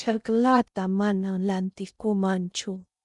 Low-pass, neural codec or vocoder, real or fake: 10.8 kHz; codec, 16 kHz in and 24 kHz out, 0.6 kbps, FocalCodec, streaming, 2048 codes; fake